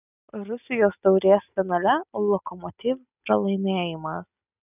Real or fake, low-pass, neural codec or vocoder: real; 3.6 kHz; none